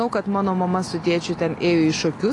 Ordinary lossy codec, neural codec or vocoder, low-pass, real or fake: AAC, 32 kbps; none; 10.8 kHz; real